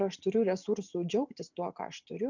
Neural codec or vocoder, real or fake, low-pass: none; real; 7.2 kHz